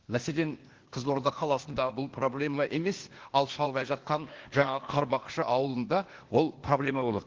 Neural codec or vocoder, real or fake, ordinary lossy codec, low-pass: codec, 16 kHz, 0.8 kbps, ZipCodec; fake; Opus, 16 kbps; 7.2 kHz